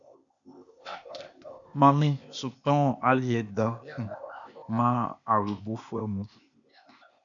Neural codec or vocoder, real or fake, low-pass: codec, 16 kHz, 0.8 kbps, ZipCodec; fake; 7.2 kHz